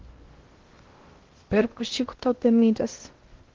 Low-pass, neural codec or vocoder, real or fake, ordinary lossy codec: 7.2 kHz; codec, 16 kHz in and 24 kHz out, 0.6 kbps, FocalCodec, streaming, 2048 codes; fake; Opus, 16 kbps